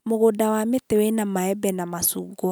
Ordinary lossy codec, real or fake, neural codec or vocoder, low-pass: none; real; none; none